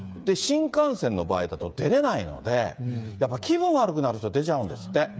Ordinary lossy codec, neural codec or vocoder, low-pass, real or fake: none; codec, 16 kHz, 8 kbps, FreqCodec, smaller model; none; fake